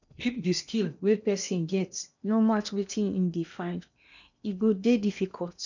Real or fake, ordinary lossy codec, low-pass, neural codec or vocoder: fake; none; 7.2 kHz; codec, 16 kHz in and 24 kHz out, 0.8 kbps, FocalCodec, streaming, 65536 codes